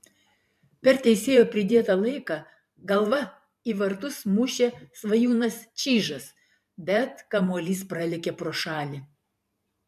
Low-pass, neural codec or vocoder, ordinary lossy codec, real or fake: 14.4 kHz; vocoder, 44.1 kHz, 128 mel bands every 512 samples, BigVGAN v2; MP3, 96 kbps; fake